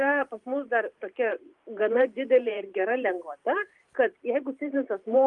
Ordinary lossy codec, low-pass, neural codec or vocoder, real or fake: Opus, 32 kbps; 10.8 kHz; vocoder, 44.1 kHz, 128 mel bands every 512 samples, BigVGAN v2; fake